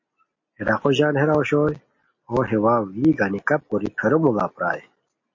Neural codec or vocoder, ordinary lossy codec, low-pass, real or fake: none; MP3, 32 kbps; 7.2 kHz; real